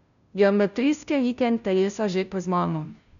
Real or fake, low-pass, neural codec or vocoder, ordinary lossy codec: fake; 7.2 kHz; codec, 16 kHz, 0.5 kbps, FunCodec, trained on Chinese and English, 25 frames a second; none